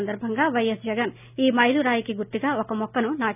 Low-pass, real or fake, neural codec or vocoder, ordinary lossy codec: 3.6 kHz; real; none; none